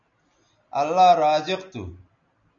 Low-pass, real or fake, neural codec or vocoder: 7.2 kHz; real; none